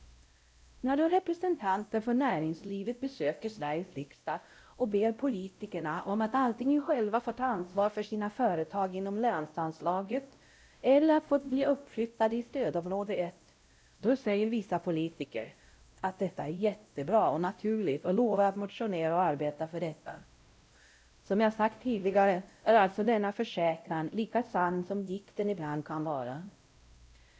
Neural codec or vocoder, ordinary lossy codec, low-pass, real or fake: codec, 16 kHz, 0.5 kbps, X-Codec, WavLM features, trained on Multilingual LibriSpeech; none; none; fake